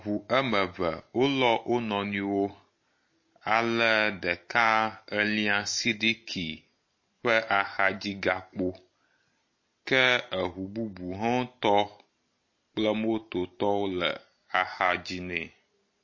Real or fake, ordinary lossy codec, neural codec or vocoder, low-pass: real; MP3, 32 kbps; none; 7.2 kHz